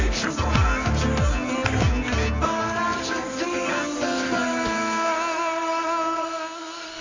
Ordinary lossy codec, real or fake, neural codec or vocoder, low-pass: AAC, 32 kbps; fake; codec, 24 kHz, 0.9 kbps, WavTokenizer, medium music audio release; 7.2 kHz